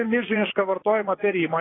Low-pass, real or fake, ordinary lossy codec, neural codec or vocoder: 7.2 kHz; fake; AAC, 16 kbps; vocoder, 22.05 kHz, 80 mel bands, Vocos